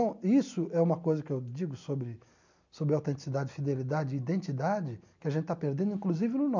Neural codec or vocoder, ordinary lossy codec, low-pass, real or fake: none; none; 7.2 kHz; real